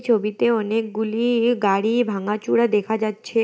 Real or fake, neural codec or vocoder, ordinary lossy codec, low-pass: real; none; none; none